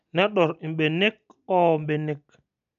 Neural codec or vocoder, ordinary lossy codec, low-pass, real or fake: none; none; 7.2 kHz; real